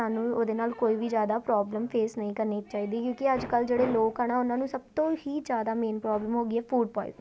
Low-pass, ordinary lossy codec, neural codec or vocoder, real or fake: none; none; none; real